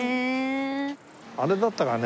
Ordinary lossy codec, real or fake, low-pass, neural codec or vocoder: none; real; none; none